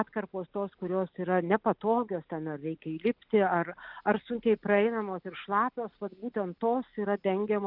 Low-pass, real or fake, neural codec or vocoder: 5.4 kHz; real; none